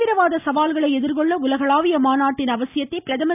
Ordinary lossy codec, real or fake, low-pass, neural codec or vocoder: none; real; 3.6 kHz; none